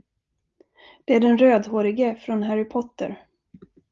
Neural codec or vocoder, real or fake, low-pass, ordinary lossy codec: none; real; 7.2 kHz; Opus, 32 kbps